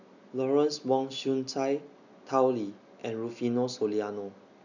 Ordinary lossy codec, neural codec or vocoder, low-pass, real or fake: none; none; 7.2 kHz; real